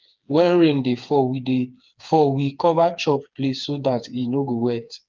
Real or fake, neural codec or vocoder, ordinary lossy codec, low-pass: fake; codec, 16 kHz, 4 kbps, FreqCodec, smaller model; Opus, 24 kbps; 7.2 kHz